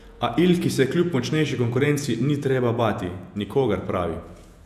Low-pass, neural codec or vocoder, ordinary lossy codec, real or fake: 14.4 kHz; vocoder, 48 kHz, 128 mel bands, Vocos; none; fake